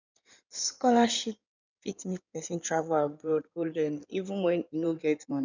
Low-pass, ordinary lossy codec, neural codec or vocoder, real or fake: 7.2 kHz; none; codec, 16 kHz in and 24 kHz out, 2.2 kbps, FireRedTTS-2 codec; fake